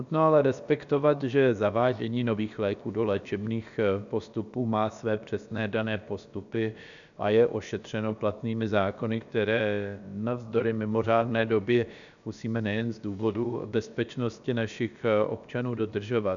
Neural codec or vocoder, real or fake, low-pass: codec, 16 kHz, about 1 kbps, DyCAST, with the encoder's durations; fake; 7.2 kHz